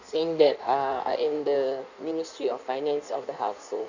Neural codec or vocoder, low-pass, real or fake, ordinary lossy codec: codec, 16 kHz in and 24 kHz out, 1.1 kbps, FireRedTTS-2 codec; 7.2 kHz; fake; none